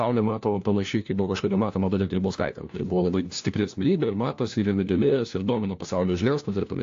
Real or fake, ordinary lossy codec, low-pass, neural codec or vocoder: fake; AAC, 48 kbps; 7.2 kHz; codec, 16 kHz, 1 kbps, FunCodec, trained on LibriTTS, 50 frames a second